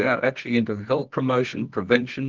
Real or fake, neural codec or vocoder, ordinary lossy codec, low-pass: fake; codec, 24 kHz, 0.9 kbps, WavTokenizer, medium music audio release; Opus, 16 kbps; 7.2 kHz